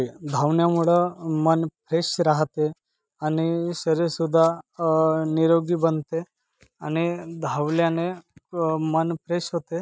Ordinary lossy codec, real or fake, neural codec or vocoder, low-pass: none; real; none; none